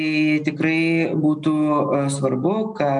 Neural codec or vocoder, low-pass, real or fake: none; 9.9 kHz; real